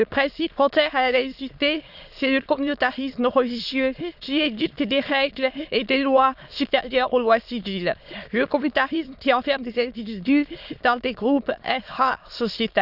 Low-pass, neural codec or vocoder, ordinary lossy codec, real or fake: 5.4 kHz; autoencoder, 22.05 kHz, a latent of 192 numbers a frame, VITS, trained on many speakers; none; fake